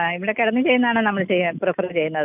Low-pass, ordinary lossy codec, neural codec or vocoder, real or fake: 3.6 kHz; none; none; real